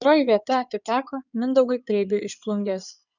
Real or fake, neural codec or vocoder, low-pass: fake; codec, 16 kHz in and 24 kHz out, 2.2 kbps, FireRedTTS-2 codec; 7.2 kHz